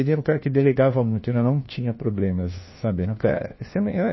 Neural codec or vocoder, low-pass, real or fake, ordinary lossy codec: codec, 16 kHz, 1 kbps, FunCodec, trained on LibriTTS, 50 frames a second; 7.2 kHz; fake; MP3, 24 kbps